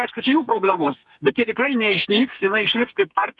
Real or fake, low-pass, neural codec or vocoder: fake; 10.8 kHz; codec, 32 kHz, 1.9 kbps, SNAC